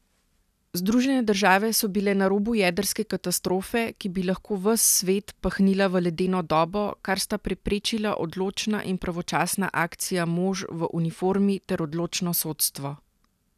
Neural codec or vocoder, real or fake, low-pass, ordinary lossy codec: none; real; 14.4 kHz; none